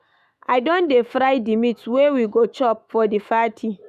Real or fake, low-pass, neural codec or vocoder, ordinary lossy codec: fake; 14.4 kHz; autoencoder, 48 kHz, 128 numbers a frame, DAC-VAE, trained on Japanese speech; none